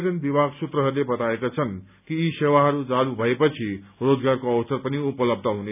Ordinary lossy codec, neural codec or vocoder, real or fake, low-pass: none; none; real; 3.6 kHz